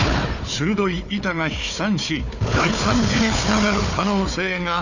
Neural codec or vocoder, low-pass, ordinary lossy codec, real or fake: codec, 16 kHz, 4 kbps, FunCodec, trained on Chinese and English, 50 frames a second; 7.2 kHz; none; fake